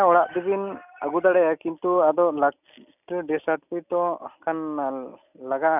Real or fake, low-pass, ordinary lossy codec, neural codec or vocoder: real; 3.6 kHz; none; none